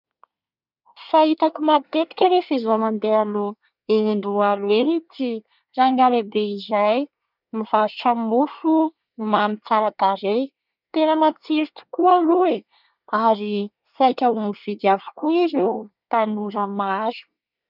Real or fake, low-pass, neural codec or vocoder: fake; 5.4 kHz; codec, 24 kHz, 1 kbps, SNAC